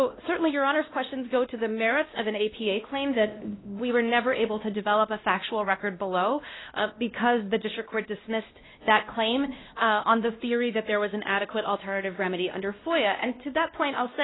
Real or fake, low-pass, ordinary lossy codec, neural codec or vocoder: fake; 7.2 kHz; AAC, 16 kbps; codec, 16 kHz, 1 kbps, X-Codec, WavLM features, trained on Multilingual LibriSpeech